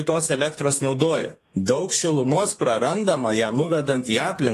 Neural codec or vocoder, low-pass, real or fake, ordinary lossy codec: codec, 32 kHz, 1.9 kbps, SNAC; 14.4 kHz; fake; AAC, 48 kbps